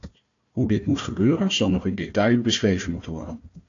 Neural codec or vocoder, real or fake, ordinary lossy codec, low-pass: codec, 16 kHz, 1 kbps, FunCodec, trained on Chinese and English, 50 frames a second; fake; AAC, 48 kbps; 7.2 kHz